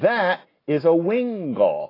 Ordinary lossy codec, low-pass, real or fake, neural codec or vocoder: AAC, 24 kbps; 5.4 kHz; real; none